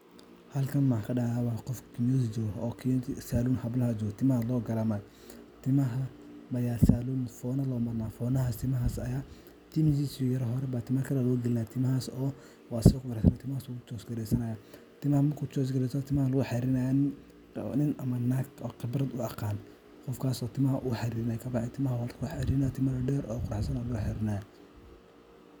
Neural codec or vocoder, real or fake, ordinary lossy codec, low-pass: none; real; none; none